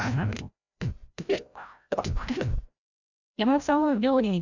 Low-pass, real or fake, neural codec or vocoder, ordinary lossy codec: 7.2 kHz; fake; codec, 16 kHz, 0.5 kbps, FreqCodec, larger model; none